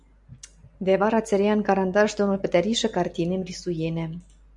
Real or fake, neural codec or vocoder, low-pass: real; none; 10.8 kHz